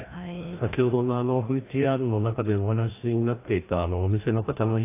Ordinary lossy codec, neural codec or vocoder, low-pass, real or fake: AAC, 24 kbps; codec, 16 kHz, 1 kbps, FreqCodec, larger model; 3.6 kHz; fake